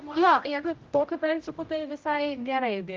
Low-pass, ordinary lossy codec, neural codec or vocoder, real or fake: 7.2 kHz; Opus, 24 kbps; codec, 16 kHz, 0.5 kbps, X-Codec, HuBERT features, trained on general audio; fake